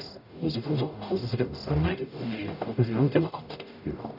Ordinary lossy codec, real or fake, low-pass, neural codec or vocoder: none; fake; 5.4 kHz; codec, 44.1 kHz, 0.9 kbps, DAC